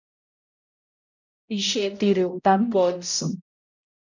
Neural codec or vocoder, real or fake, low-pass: codec, 16 kHz, 0.5 kbps, X-Codec, HuBERT features, trained on balanced general audio; fake; 7.2 kHz